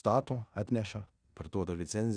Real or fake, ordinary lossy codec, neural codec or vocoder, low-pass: fake; Opus, 64 kbps; codec, 16 kHz in and 24 kHz out, 0.9 kbps, LongCat-Audio-Codec, four codebook decoder; 9.9 kHz